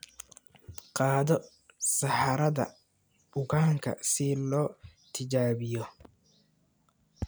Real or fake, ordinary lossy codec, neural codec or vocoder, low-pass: real; none; none; none